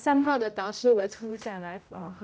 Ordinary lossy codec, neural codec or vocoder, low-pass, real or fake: none; codec, 16 kHz, 0.5 kbps, X-Codec, HuBERT features, trained on general audio; none; fake